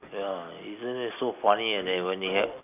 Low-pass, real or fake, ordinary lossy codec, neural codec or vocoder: 3.6 kHz; real; none; none